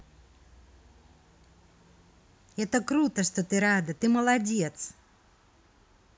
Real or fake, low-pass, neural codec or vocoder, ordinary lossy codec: real; none; none; none